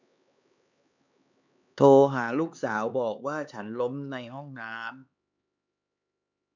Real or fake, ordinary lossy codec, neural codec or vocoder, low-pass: fake; none; codec, 16 kHz, 4 kbps, X-Codec, HuBERT features, trained on LibriSpeech; 7.2 kHz